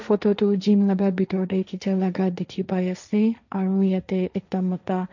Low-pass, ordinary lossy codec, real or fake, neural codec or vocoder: 7.2 kHz; none; fake; codec, 16 kHz, 1.1 kbps, Voila-Tokenizer